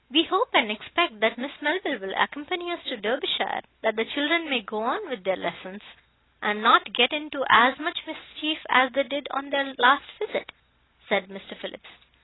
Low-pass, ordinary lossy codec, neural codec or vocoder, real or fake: 7.2 kHz; AAC, 16 kbps; none; real